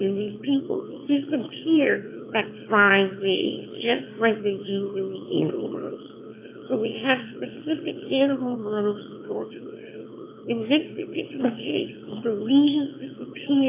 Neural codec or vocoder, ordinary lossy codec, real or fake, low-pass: autoencoder, 22.05 kHz, a latent of 192 numbers a frame, VITS, trained on one speaker; AAC, 24 kbps; fake; 3.6 kHz